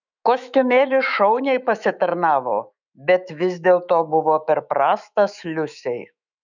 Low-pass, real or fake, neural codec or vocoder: 7.2 kHz; fake; autoencoder, 48 kHz, 128 numbers a frame, DAC-VAE, trained on Japanese speech